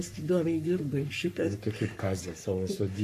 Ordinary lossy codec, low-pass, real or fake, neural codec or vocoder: MP3, 64 kbps; 14.4 kHz; fake; codec, 44.1 kHz, 3.4 kbps, Pupu-Codec